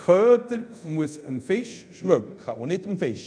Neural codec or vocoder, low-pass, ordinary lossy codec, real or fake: codec, 24 kHz, 0.5 kbps, DualCodec; 9.9 kHz; none; fake